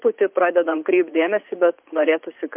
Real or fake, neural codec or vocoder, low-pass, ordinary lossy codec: fake; vocoder, 44.1 kHz, 80 mel bands, Vocos; 3.6 kHz; MP3, 32 kbps